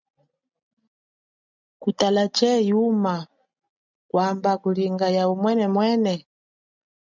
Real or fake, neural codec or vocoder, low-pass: real; none; 7.2 kHz